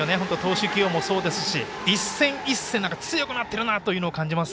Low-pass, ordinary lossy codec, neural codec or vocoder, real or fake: none; none; none; real